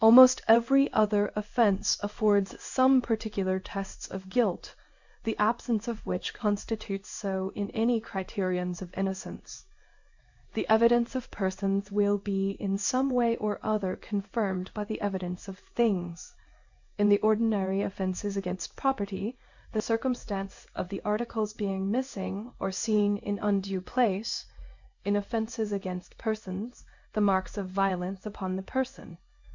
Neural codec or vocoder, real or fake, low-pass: codec, 16 kHz in and 24 kHz out, 1 kbps, XY-Tokenizer; fake; 7.2 kHz